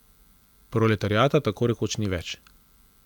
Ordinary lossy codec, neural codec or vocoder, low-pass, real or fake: none; none; 19.8 kHz; real